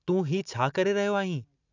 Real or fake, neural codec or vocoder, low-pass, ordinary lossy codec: real; none; 7.2 kHz; none